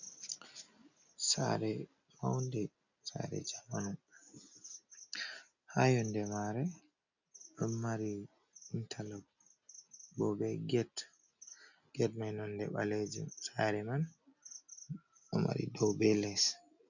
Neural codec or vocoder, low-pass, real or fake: none; 7.2 kHz; real